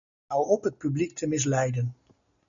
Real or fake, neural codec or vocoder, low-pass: real; none; 7.2 kHz